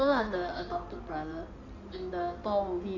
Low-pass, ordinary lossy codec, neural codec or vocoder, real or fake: 7.2 kHz; none; codec, 16 kHz in and 24 kHz out, 2.2 kbps, FireRedTTS-2 codec; fake